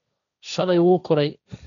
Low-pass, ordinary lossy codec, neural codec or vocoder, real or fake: 7.2 kHz; none; codec, 16 kHz, 1.1 kbps, Voila-Tokenizer; fake